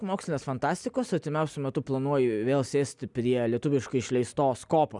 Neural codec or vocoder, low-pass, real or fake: none; 10.8 kHz; real